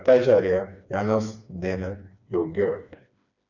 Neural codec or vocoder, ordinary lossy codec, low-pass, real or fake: codec, 16 kHz, 2 kbps, FreqCodec, smaller model; none; 7.2 kHz; fake